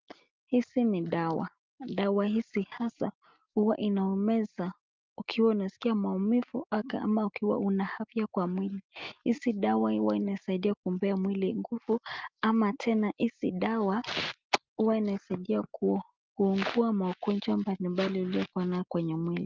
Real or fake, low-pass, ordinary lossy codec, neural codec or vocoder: real; 7.2 kHz; Opus, 32 kbps; none